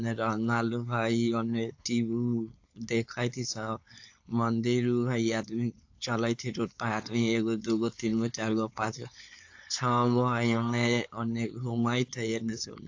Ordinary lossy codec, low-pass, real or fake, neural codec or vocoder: AAC, 48 kbps; 7.2 kHz; fake; codec, 16 kHz, 4.8 kbps, FACodec